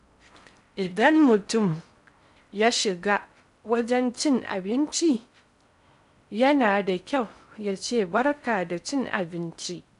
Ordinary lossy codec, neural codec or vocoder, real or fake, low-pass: none; codec, 16 kHz in and 24 kHz out, 0.6 kbps, FocalCodec, streaming, 4096 codes; fake; 10.8 kHz